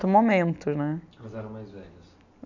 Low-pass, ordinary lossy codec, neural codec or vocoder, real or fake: 7.2 kHz; none; none; real